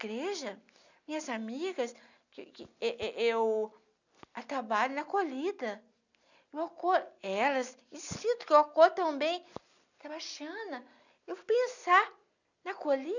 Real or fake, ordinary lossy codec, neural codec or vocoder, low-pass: real; none; none; 7.2 kHz